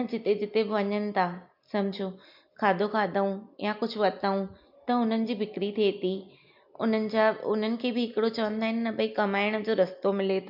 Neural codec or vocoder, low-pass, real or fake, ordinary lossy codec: none; 5.4 kHz; real; MP3, 48 kbps